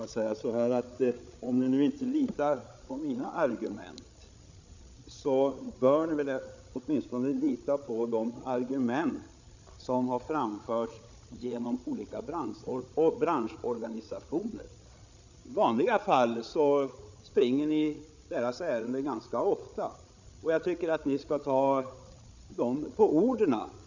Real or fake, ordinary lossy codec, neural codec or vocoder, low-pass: fake; none; codec, 16 kHz, 8 kbps, FreqCodec, larger model; 7.2 kHz